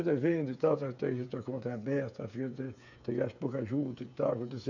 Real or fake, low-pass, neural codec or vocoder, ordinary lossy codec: fake; 7.2 kHz; codec, 16 kHz, 8 kbps, FreqCodec, smaller model; none